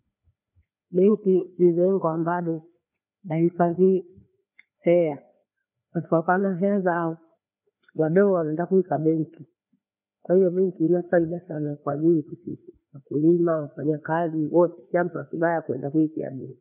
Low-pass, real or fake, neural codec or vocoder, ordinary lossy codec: 3.6 kHz; fake; codec, 16 kHz, 2 kbps, FreqCodec, larger model; none